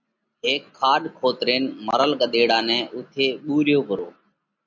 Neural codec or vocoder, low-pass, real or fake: none; 7.2 kHz; real